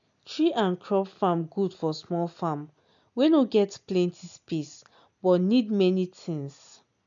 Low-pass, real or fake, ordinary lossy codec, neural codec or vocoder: 7.2 kHz; real; none; none